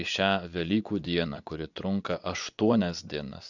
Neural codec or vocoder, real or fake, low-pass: vocoder, 24 kHz, 100 mel bands, Vocos; fake; 7.2 kHz